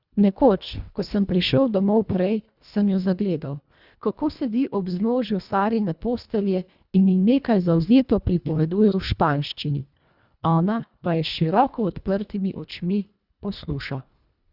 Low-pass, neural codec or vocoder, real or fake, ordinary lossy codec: 5.4 kHz; codec, 24 kHz, 1.5 kbps, HILCodec; fake; Opus, 64 kbps